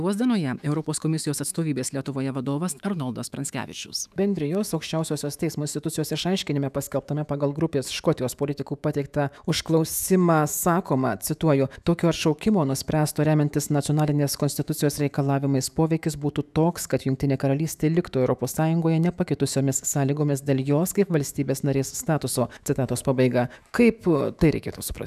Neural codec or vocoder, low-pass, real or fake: autoencoder, 48 kHz, 128 numbers a frame, DAC-VAE, trained on Japanese speech; 14.4 kHz; fake